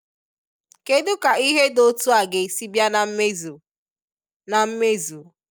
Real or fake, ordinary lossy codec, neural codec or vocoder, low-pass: real; none; none; none